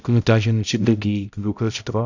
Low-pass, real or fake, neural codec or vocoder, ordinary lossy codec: 7.2 kHz; fake; codec, 16 kHz, 0.5 kbps, X-Codec, HuBERT features, trained on balanced general audio; none